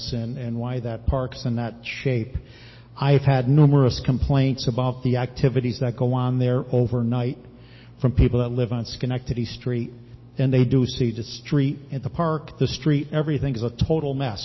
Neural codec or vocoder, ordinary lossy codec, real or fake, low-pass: none; MP3, 24 kbps; real; 7.2 kHz